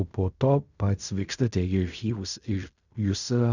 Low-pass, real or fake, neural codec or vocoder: 7.2 kHz; fake; codec, 16 kHz in and 24 kHz out, 0.4 kbps, LongCat-Audio-Codec, fine tuned four codebook decoder